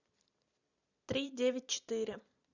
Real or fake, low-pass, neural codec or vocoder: fake; 7.2 kHz; vocoder, 44.1 kHz, 128 mel bands every 256 samples, BigVGAN v2